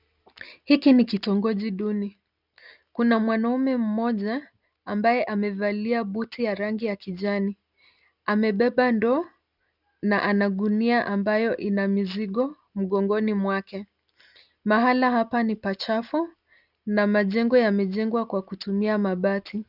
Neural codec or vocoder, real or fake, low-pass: none; real; 5.4 kHz